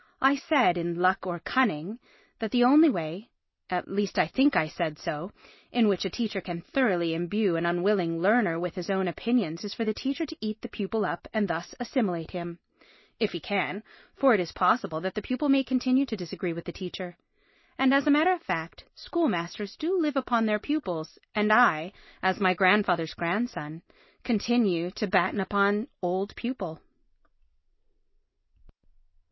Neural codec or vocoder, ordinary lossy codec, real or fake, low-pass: none; MP3, 24 kbps; real; 7.2 kHz